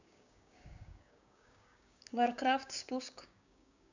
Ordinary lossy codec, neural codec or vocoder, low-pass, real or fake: none; codec, 16 kHz, 6 kbps, DAC; 7.2 kHz; fake